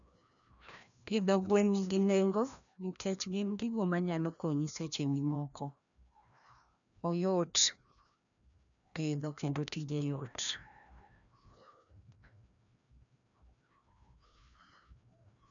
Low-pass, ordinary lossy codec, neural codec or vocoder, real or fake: 7.2 kHz; none; codec, 16 kHz, 1 kbps, FreqCodec, larger model; fake